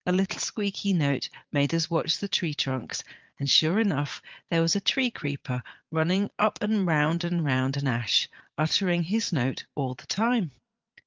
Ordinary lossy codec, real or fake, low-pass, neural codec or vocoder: Opus, 24 kbps; fake; 7.2 kHz; vocoder, 22.05 kHz, 80 mel bands, Vocos